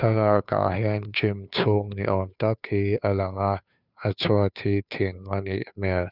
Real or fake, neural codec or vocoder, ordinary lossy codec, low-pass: fake; codec, 16 kHz, 2 kbps, FunCodec, trained on Chinese and English, 25 frames a second; none; 5.4 kHz